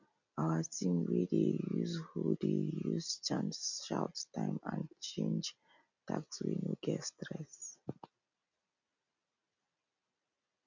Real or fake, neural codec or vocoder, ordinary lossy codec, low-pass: real; none; none; 7.2 kHz